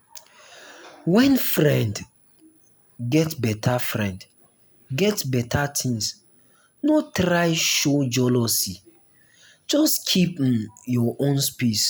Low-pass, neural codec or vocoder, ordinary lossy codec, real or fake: none; none; none; real